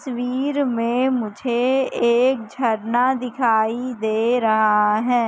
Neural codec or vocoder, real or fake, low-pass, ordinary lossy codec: none; real; none; none